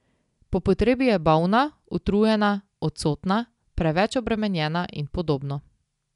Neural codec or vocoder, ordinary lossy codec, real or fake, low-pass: none; none; real; 10.8 kHz